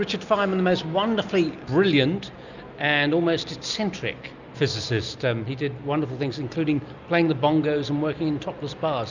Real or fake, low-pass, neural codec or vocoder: real; 7.2 kHz; none